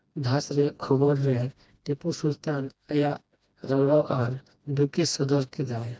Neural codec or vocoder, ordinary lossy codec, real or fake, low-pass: codec, 16 kHz, 1 kbps, FreqCodec, smaller model; none; fake; none